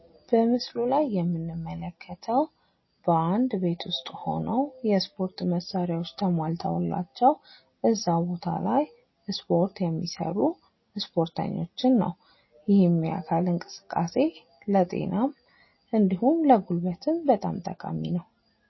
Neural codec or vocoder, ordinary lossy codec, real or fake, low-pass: none; MP3, 24 kbps; real; 7.2 kHz